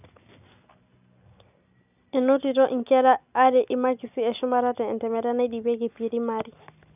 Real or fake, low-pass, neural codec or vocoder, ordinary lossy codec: real; 3.6 kHz; none; none